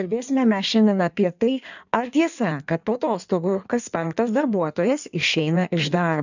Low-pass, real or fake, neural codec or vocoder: 7.2 kHz; fake; codec, 16 kHz in and 24 kHz out, 1.1 kbps, FireRedTTS-2 codec